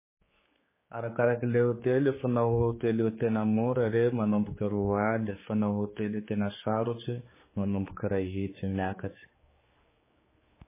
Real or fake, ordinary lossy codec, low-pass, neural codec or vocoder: fake; MP3, 16 kbps; 3.6 kHz; codec, 16 kHz, 4 kbps, X-Codec, HuBERT features, trained on general audio